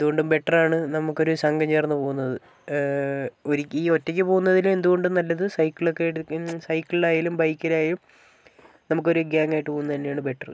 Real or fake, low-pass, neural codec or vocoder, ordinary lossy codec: real; none; none; none